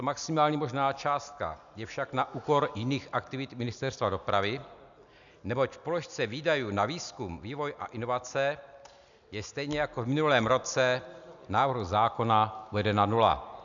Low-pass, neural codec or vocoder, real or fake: 7.2 kHz; none; real